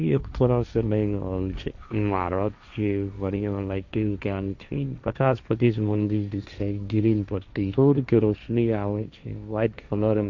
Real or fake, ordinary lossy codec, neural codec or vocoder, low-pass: fake; none; codec, 16 kHz, 1.1 kbps, Voila-Tokenizer; 7.2 kHz